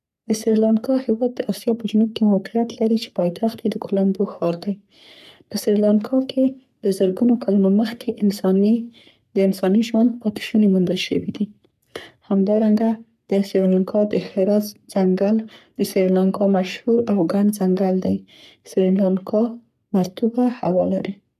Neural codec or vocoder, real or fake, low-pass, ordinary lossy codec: codec, 44.1 kHz, 3.4 kbps, Pupu-Codec; fake; 14.4 kHz; none